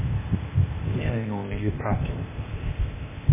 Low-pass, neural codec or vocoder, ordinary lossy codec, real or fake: 3.6 kHz; codec, 16 kHz, 0.8 kbps, ZipCodec; MP3, 16 kbps; fake